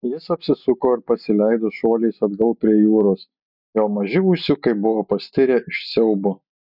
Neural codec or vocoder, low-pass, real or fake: none; 5.4 kHz; real